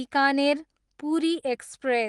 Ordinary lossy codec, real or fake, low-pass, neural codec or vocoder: Opus, 24 kbps; real; 10.8 kHz; none